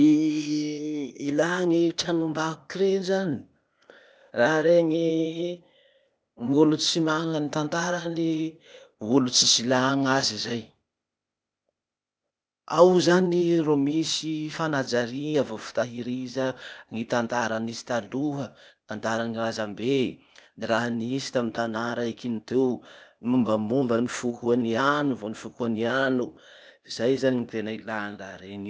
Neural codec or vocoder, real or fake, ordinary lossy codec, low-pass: codec, 16 kHz, 0.8 kbps, ZipCodec; fake; none; none